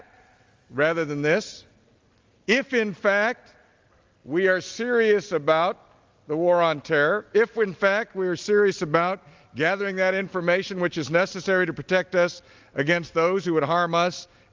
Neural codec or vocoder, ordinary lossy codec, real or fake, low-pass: none; Opus, 32 kbps; real; 7.2 kHz